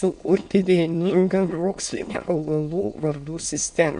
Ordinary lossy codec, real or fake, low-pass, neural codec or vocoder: MP3, 64 kbps; fake; 9.9 kHz; autoencoder, 22.05 kHz, a latent of 192 numbers a frame, VITS, trained on many speakers